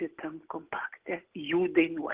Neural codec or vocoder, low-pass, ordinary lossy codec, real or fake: none; 3.6 kHz; Opus, 16 kbps; real